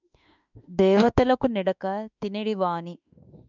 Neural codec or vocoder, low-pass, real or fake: autoencoder, 48 kHz, 32 numbers a frame, DAC-VAE, trained on Japanese speech; 7.2 kHz; fake